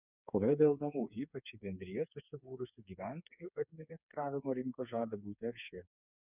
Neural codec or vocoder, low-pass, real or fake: codec, 16 kHz, 4 kbps, FreqCodec, smaller model; 3.6 kHz; fake